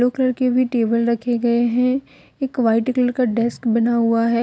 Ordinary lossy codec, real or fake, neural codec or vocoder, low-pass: none; real; none; none